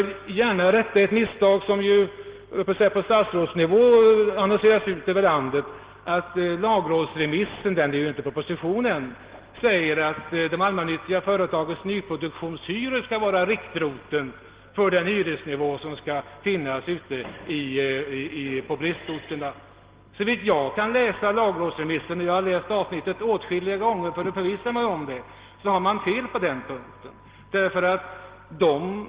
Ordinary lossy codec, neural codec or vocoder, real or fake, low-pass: Opus, 16 kbps; none; real; 3.6 kHz